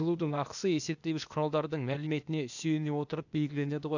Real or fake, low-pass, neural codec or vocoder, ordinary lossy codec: fake; 7.2 kHz; codec, 16 kHz, 0.8 kbps, ZipCodec; none